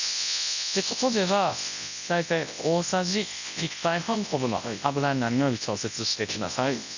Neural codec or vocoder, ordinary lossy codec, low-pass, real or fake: codec, 24 kHz, 0.9 kbps, WavTokenizer, large speech release; none; 7.2 kHz; fake